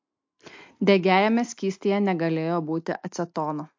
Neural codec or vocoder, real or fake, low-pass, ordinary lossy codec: none; real; 7.2 kHz; MP3, 48 kbps